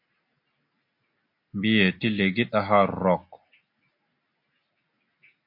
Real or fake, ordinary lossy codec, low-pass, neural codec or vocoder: real; MP3, 32 kbps; 5.4 kHz; none